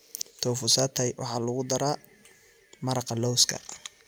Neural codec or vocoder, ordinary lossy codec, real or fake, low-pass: none; none; real; none